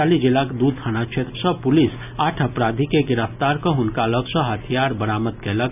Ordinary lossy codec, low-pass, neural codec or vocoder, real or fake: none; 3.6 kHz; none; real